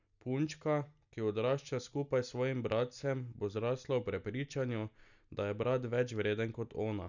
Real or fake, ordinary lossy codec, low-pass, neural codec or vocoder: real; none; 7.2 kHz; none